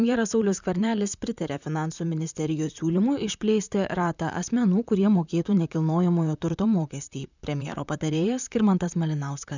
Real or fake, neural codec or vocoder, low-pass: fake; vocoder, 44.1 kHz, 128 mel bands, Pupu-Vocoder; 7.2 kHz